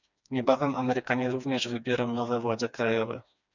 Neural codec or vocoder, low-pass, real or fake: codec, 16 kHz, 2 kbps, FreqCodec, smaller model; 7.2 kHz; fake